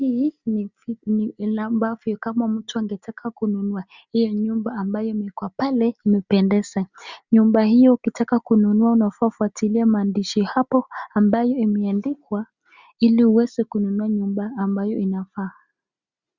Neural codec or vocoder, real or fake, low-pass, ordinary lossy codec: none; real; 7.2 kHz; Opus, 64 kbps